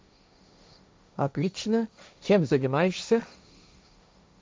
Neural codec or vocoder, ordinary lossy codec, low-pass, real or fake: codec, 16 kHz, 1.1 kbps, Voila-Tokenizer; none; none; fake